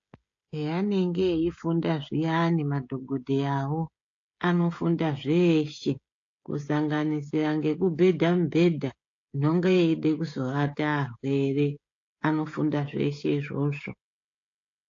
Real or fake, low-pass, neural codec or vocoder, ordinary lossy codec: fake; 7.2 kHz; codec, 16 kHz, 16 kbps, FreqCodec, smaller model; AAC, 48 kbps